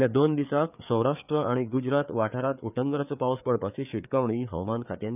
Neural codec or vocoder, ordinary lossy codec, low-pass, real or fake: codec, 16 kHz, 4 kbps, FreqCodec, larger model; none; 3.6 kHz; fake